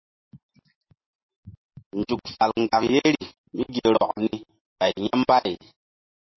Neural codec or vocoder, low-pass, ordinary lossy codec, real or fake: none; 7.2 kHz; MP3, 24 kbps; real